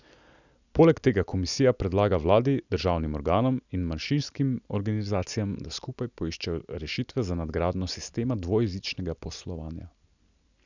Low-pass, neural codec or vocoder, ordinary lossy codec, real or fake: 7.2 kHz; none; none; real